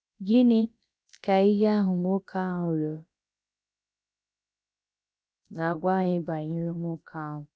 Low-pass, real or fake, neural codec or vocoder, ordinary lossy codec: none; fake; codec, 16 kHz, about 1 kbps, DyCAST, with the encoder's durations; none